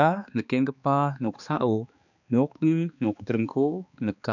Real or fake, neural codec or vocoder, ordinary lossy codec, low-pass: fake; codec, 16 kHz, 2 kbps, X-Codec, HuBERT features, trained on balanced general audio; none; 7.2 kHz